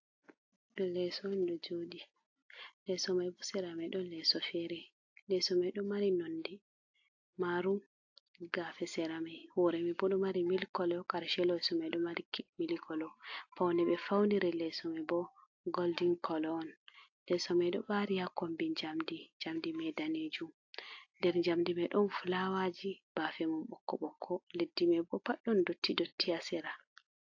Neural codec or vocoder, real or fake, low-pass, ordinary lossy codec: none; real; 7.2 kHz; AAC, 48 kbps